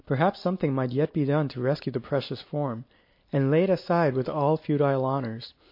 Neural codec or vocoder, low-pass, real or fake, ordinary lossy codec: none; 5.4 kHz; real; MP3, 32 kbps